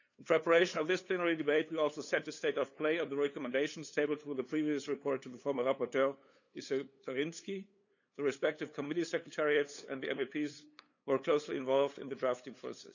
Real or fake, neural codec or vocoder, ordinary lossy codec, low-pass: fake; codec, 16 kHz, 8 kbps, FunCodec, trained on LibriTTS, 25 frames a second; none; 7.2 kHz